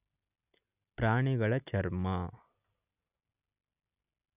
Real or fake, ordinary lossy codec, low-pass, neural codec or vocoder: real; none; 3.6 kHz; none